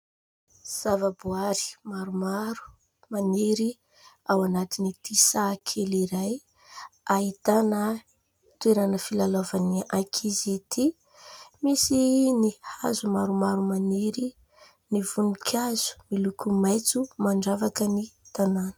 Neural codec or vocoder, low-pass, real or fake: none; 19.8 kHz; real